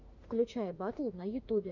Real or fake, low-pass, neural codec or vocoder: fake; 7.2 kHz; autoencoder, 48 kHz, 32 numbers a frame, DAC-VAE, trained on Japanese speech